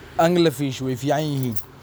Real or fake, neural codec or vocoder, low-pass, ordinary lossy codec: real; none; none; none